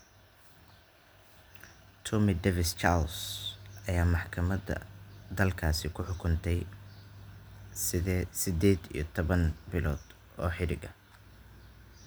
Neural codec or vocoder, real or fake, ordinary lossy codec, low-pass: none; real; none; none